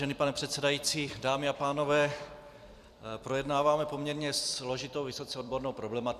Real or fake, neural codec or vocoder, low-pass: fake; vocoder, 44.1 kHz, 128 mel bands every 256 samples, BigVGAN v2; 14.4 kHz